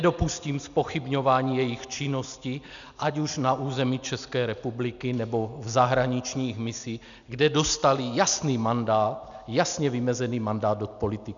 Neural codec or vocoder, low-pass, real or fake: none; 7.2 kHz; real